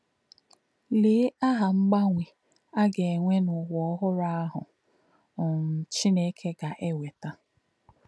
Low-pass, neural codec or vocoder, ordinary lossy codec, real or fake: none; none; none; real